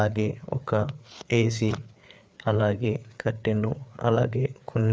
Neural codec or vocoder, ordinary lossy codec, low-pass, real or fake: codec, 16 kHz, 4 kbps, FreqCodec, larger model; none; none; fake